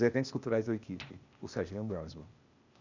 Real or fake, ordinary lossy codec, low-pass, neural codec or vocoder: fake; none; 7.2 kHz; codec, 16 kHz, 0.8 kbps, ZipCodec